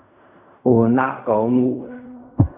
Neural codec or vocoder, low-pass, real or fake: codec, 16 kHz in and 24 kHz out, 0.4 kbps, LongCat-Audio-Codec, fine tuned four codebook decoder; 3.6 kHz; fake